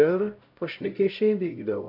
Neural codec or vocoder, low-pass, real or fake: codec, 16 kHz, 0.5 kbps, X-Codec, HuBERT features, trained on LibriSpeech; 5.4 kHz; fake